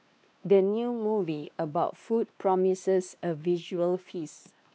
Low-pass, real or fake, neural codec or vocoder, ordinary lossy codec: none; fake; codec, 16 kHz, 2 kbps, X-Codec, WavLM features, trained on Multilingual LibriSpeech; none